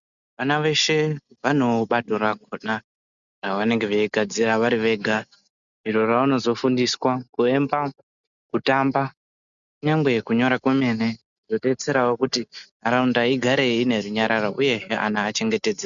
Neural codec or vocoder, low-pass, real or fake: none; 7.2 kHz; real